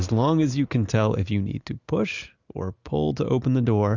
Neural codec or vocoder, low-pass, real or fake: none; 7.2 kHz; real